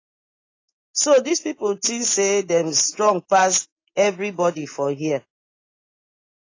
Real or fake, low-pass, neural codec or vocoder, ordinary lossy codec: real; 7.2 kHz; none; AAC, 32 kbps